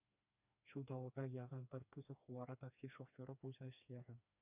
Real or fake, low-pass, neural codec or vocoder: fake; 3.6 kHz; codec, 16 kHz, 2 kbps, FreqCodec, smaller model